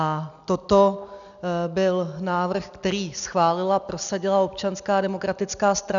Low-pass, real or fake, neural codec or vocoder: 7.2 kHz; real; none